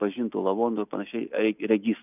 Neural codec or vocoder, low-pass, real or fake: none; 3.6 kHz; real